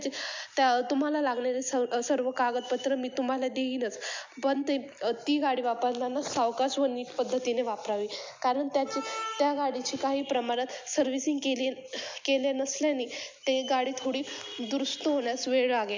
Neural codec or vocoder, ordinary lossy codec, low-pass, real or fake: none; MP3, 64 kbps; 7.2 kHz; real